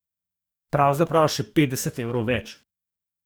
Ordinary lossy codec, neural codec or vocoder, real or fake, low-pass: none; codec, 44.1 kHz, 2.6 kbps, DAC; fake; none